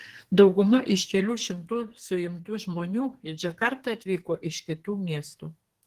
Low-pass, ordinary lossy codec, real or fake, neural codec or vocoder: 14.4 kHz; Opus, 16 kbps; fake; codec, 32 kHz, 1.9 kbps, SNAC